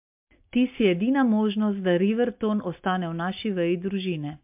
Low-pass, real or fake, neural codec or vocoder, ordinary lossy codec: 3.6 kHz; real; none; MP3, 32 kbps